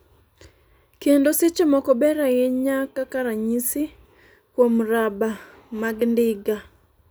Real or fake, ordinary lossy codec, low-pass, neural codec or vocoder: real; none; none; none